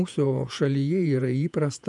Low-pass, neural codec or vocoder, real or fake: 10.8 kHz; vocoder, 48 kHz, 128 mel bands, Vocos; fake